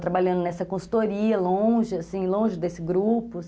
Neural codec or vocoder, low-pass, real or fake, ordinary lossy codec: none; none; real; none